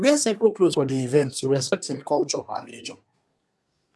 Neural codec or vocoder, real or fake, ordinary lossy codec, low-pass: codec, 24 kHz, 1 kbps, SNAC; fake; none; none